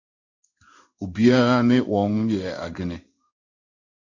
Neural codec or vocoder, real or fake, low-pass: codec, 16 kHz in and 24 kHz out, 1 kbps, XY-Tokenizer; fake; 7.2 kHz